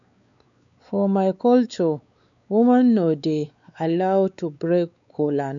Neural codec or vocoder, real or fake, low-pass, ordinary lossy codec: codec, 16 kHz, 4 kbps, X-Codec, WavLM features, trained on Multilingual LibriSpeech; fake; 7.2 kHz; none